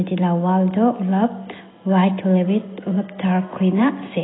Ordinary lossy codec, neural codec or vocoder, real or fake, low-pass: AAC, 16 kbps; none; real; 7.2 kHz